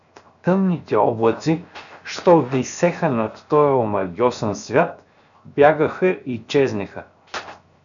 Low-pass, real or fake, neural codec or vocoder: 7.2 kHz; fake; codec, 16 kHz, 0.7 kbps, FocalCodec